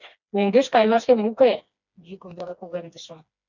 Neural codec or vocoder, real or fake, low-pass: codec, 16 kHz, 2 kbps, FreqCodec, smaller model; fake; 7.2 kHz